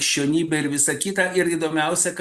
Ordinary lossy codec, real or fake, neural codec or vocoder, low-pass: Opus, 64 kbps; real; none; 14.4 kHz